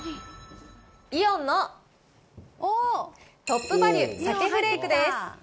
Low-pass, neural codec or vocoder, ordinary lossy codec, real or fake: none; none; none; real